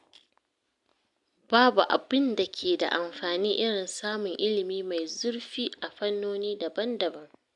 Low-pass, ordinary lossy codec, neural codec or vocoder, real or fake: 10.8 kHz; none; none; real